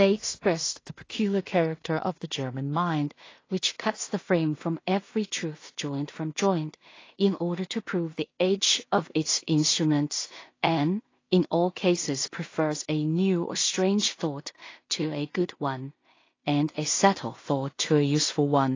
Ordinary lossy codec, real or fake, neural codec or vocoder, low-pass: AAC, 32 kbps; fake; codec, 16 kHz in and 24 kHz out, 0.4 kbps, LongCat-Audio-Codec, two codebook decoder; 7.2 kHz